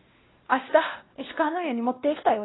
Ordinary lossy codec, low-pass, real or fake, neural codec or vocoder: AAC, 16 kbps; 7.2 kHz; fake; codec, 16 kHz, 1 kbps, X-Codec, WavLM features, trained on Multilingual LibriSpeech